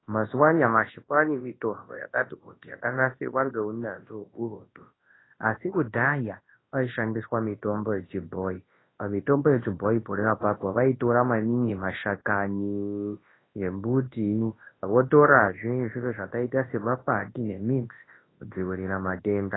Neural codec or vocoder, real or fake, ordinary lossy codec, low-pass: codec, 24 kHz, 0.9 kbps, WavTokenizer, large speech release; fake; AAC, 16 kbps; 7.2 kHz